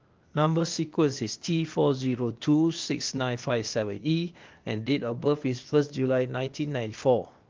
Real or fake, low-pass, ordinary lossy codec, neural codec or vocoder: fake; 7.2 kHz; Opus, 32 kbps; codec, 16 kHz, 0.8 kbps, ZipCodec